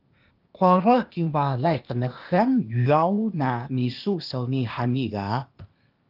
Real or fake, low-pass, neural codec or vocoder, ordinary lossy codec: fake; 5.4 kHz; codec, 16 kHz, 0.8 kbps, ZipCodec; Opus, 24 kbps